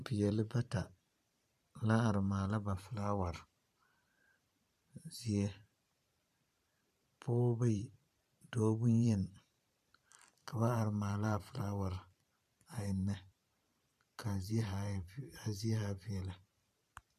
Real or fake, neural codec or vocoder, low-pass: fake; vocoder, 44.1 kHz, 128 mel bands every 512 samples, BigVGAN v2; 14.4 kHz